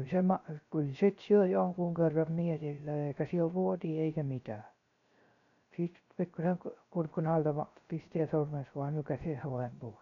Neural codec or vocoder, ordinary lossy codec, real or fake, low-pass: codec, 16 kHz, 0.3 kbps, FocalCodec; none; fake; 7.2 kHz